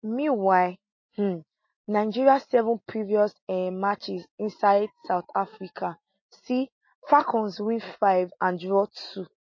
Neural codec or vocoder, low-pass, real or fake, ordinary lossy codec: none; 7.2 kHz; real; MP3, 32 kbps